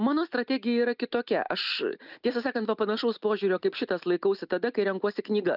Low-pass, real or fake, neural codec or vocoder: 5.4 kHz; real; none